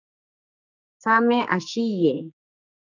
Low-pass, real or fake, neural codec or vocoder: 7.2 kHz; fake; codec, 44.1 kHz, 2.6 kbps, SNAC